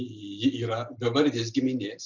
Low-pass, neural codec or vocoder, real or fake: 7.2 kHz; none; real